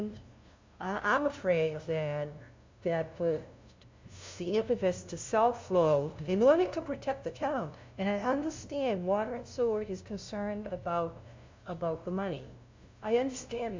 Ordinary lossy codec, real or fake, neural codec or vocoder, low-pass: MP3, 64 kbps; fake; codec, 16 kHz, 0.5 kbps, FunCodec, trained on LibriTTS, 25 frames a second; 7.2 kHz